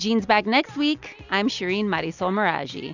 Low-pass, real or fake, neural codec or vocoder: 7.2 kHz; real; none